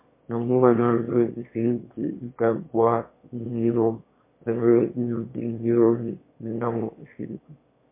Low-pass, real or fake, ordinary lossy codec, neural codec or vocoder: 3.6 kHz; fake; MP3, 24 kbps; autoencoder, 22.05 kHz, a latent of 192 numbers a frame, VITS, trained on one speaker